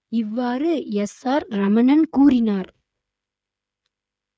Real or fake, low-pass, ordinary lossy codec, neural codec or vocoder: fake; none; none; codec, 16 kHz, 8 kbps, FreqCodec, smaller model